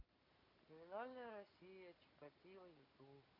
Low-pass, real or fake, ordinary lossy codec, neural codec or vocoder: 5.4 kHz; real; none; none